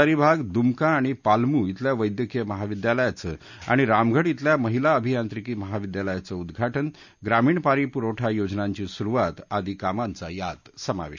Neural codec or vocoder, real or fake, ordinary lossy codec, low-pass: none; real; none; 7.2 kHz